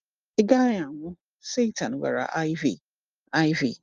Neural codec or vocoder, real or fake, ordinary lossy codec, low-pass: none; real; Opus, 32 kbps; 7.2 kHz